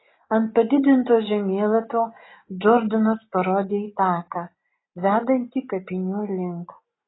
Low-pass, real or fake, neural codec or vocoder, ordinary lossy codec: 7.2 kHz; real; none; AAC, 16 kbps